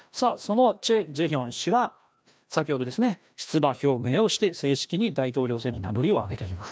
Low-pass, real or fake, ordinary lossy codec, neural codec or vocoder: none; fake; none; codec, 16 kHz, 1 kbps, FreqCodec, larger model